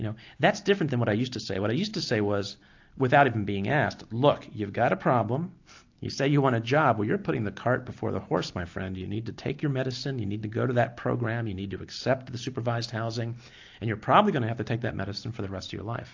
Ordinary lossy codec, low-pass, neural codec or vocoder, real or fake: AAC, 48 kbps; 7.2 kHz; none; real